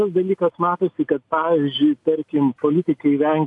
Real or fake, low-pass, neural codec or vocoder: real; 10.8 kHz; none